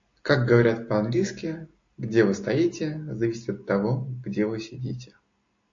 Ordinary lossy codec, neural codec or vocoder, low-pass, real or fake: AAC, 48 kbps; none; 7.2 kHz; real